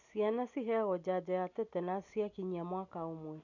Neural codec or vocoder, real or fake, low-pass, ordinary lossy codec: none; real; 7.2 kHz; none